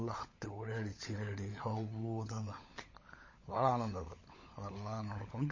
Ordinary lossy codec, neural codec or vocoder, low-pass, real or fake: MP3, 32 kbps; codec, 16 kHz, 16 kbps, FunCodec, trained on LibriTTS, 50 frames a second; 7.2 kHz; fake